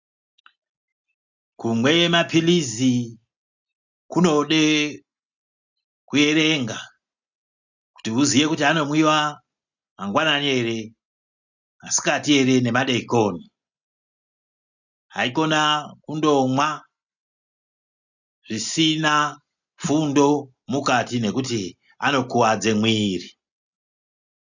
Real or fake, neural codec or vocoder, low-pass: real; none; 7.2 kHz